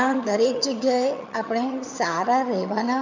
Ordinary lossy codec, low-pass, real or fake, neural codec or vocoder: MP3, 64 kbps; 7.2 kHz; fake; vocoder, 22.05 kHz, 80 mel bands, HiFi-GAN